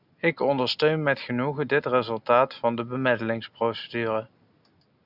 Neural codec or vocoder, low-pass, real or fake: none; 5.4 kHz; real